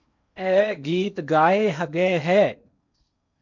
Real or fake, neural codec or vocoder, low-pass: fake; codec, 16 kHz in and 24 kHz out, 0.8 kbps, FocalCodec, streaming, 65536 codes; 7.2 kHz